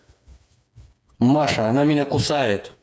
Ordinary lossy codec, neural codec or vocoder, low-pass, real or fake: none; codec, 16 kHz, 4 kbps, FreqCodec, smaller model; none; fake